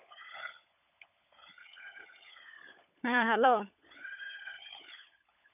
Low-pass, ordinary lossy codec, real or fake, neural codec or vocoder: 3.6 kHz; none; fake; codec, 16 kHz, 16 kbps, FunCodec, trained on LibriTTS, 50 frames a second